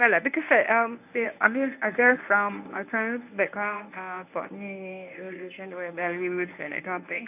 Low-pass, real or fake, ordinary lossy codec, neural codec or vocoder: 3.6 kHz; fake; none; codec, 24 kHz, 0.9 kbps, WavTokenizer, medium speech release version 1